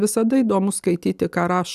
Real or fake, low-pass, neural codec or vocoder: real; 14.4 kHz; none